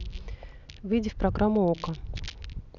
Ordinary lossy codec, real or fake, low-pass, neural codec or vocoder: none; real; 7.2 kHz; none